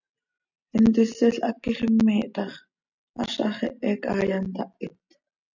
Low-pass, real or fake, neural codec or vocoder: 7.2 kHz; real; none